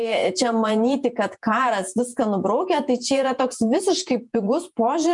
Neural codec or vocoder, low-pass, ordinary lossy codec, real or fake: vocoder, 48 kHz, 128 mel bands, Vocos; 10.8 kHz; MP3, 96 kbps; fake